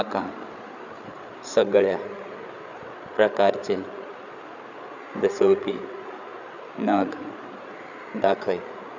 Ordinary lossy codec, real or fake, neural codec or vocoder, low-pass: none; fake; codec, 16 kHz, 8 kbps, FreqCodec, larger model; 7.2 kHz